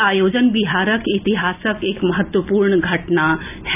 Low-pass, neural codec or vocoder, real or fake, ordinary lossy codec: 3.6 kHz; none; real; none